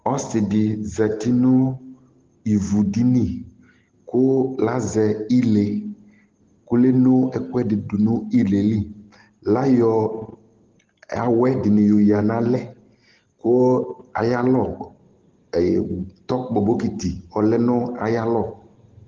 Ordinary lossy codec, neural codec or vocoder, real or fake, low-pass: Opus, 16 kbps; none; real; 7.2 kHz